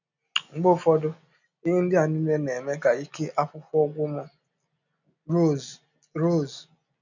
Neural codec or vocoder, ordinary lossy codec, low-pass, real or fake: none; none; 7.2 kHz; real